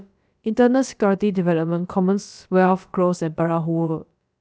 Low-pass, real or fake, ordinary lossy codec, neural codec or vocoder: none; fake; none; codec, 16 kHz, about 1 kbps, DyCAST, with the encoder's durations